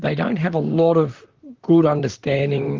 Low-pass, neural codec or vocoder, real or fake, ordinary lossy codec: 7.2 kHz; vocoder, 44.1 kHz, 128 mel bands, Pupu-Vocoder; fake; Opus, 32 kbps